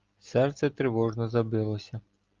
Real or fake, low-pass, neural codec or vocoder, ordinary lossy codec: real; 7.2 kHz; none; Opus, 32 kbps